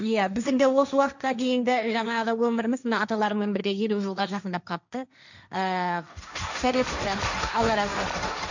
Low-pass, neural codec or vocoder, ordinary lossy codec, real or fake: 7.2 kHz; codec, 16 kHz, 1.1 kbps, Voila-Tokenizer; none; fake